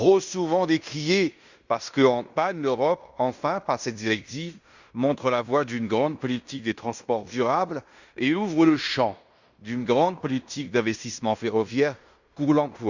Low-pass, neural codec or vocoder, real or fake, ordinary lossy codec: 7.2 kHz; codec, 16 kHz in and 24 kHz out, 0.9 kbps, LongCat-Audio-Codec, fine tuned four codebook decoder; fake; Opus, 64 kbps